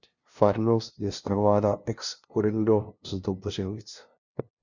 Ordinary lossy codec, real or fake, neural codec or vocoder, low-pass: Opus, 64 kbps; fake; codec, 16 kHz, 0.5 kbps, FunCodec, trained on LibriTTS, 25 frames a second; 7.2 kHz